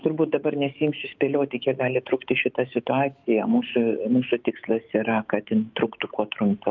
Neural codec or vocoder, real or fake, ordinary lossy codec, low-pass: autoencoder, 48 kHz, 128 numbers a frame, DAC-VAE, trained on Japanese speech; fake; Opus, 24 kbps; 7.2 kHz